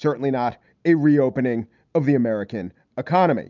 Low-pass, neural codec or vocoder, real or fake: 7.2 kHz; none; real